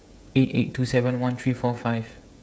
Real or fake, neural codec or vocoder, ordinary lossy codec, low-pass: fake; codec, 16 kHz, 16 kbps, FreqCodec, smaller model; none; none